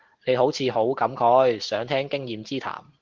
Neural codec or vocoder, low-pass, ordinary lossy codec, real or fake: none; 7.2 kHz; Opus, 16 kbps; real